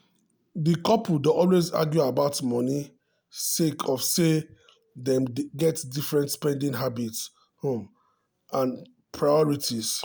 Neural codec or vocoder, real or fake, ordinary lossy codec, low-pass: none; real; none; none